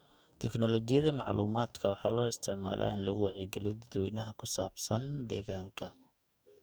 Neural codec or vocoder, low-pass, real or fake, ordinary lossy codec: codec, 44.1 kHz, 2.6 kbps, DAC; none; fake; none